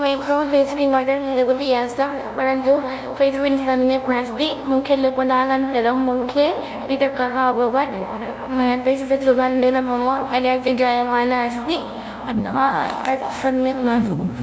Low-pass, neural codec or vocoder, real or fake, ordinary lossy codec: none; codec, 16 kHz, 0.5 kbps, FunCodec, trained on LibriTTS, 25 frames a second; fake; none